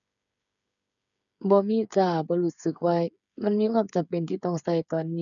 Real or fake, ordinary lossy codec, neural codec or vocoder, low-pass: fake; none; codec, 16 kHz, 8 kbps, FreqCodec, smaller model; 7.2 kHz